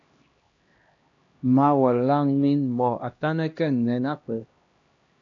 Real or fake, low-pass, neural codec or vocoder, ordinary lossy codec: fake; 7.2 kHz; codec, 16 kHz, 1 kbps, X-Codec, HuBERT features, trained on LibriSpeech; AAC, 48 kbps